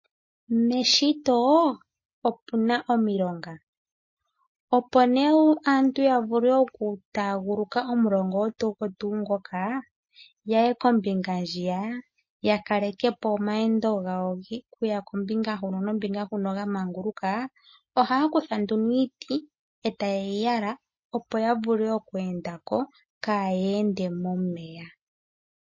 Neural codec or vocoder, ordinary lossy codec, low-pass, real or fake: none; MP3, 32 kbps; 7.2 kHz; real